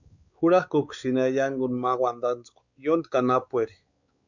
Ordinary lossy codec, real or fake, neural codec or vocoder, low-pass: Opus, 64 kbps; fake; codec, 16 kHz, 4 kbps, X-Codec, WavLM features, trained on Multilingual LibriSpeech; 7.2 kHz